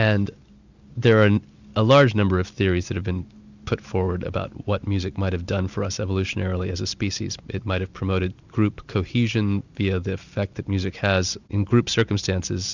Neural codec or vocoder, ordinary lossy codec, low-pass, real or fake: none; Opus, 64 kbps; 7.2 kHz; real